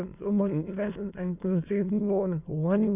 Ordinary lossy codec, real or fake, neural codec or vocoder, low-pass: none; fake; autoencoder, 22.05 kHz, a latent of 192 numbers a frame, VITS, trained on many speakers; 3.6 kHz